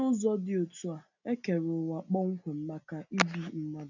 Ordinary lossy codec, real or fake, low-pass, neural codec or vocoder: none; real; 7.2 kHz; none